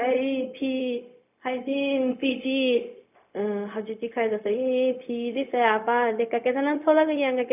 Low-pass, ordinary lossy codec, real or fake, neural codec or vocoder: 3.6 kHz; none; fake; codec, 16 kHz, 0.4 kbps, LongCat-Audio-Codec